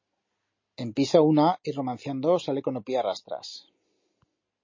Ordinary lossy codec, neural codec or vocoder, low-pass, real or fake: MP3, 32 kbps; none; 7.2 kHz; real